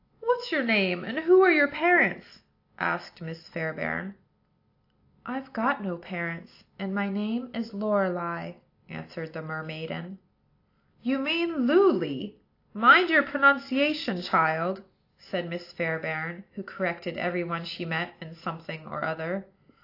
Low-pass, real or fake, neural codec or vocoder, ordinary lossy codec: 5.4 kHz; real; none; AAC, 32 kbps